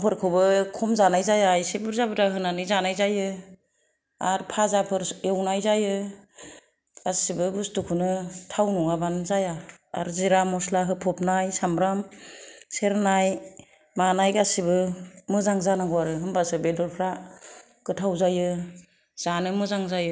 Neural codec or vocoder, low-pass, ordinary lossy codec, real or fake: none; none; none; real